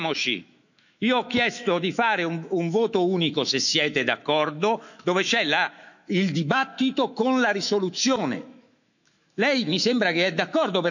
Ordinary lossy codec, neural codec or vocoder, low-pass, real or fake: none; autoencoder, 48 kHz, 128 numbers a frame, DAC-VAE, trained on Japanese speech; 7.2 kHz; fake